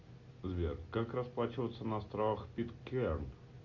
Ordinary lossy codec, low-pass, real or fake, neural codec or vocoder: MP3, 48 kbps; 7.2 kHz; real; none